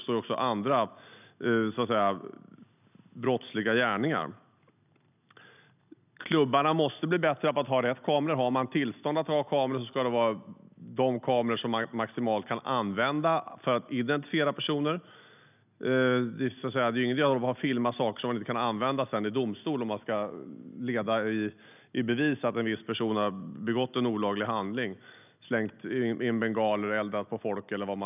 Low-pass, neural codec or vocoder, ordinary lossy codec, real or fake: 3.6 kHz; none; none; real